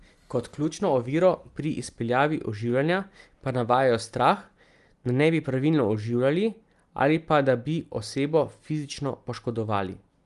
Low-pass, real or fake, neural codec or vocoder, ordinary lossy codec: 10.8 kHz; real; none; Opus, 32 kbps